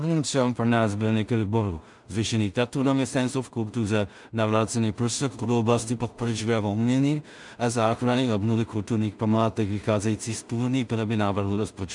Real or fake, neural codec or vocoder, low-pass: fake; codec, 16 kHz in and 24 kHz out, 0.4 kbps, LongCat-Audio-Codec, two codebook decoder; 10.8 kHz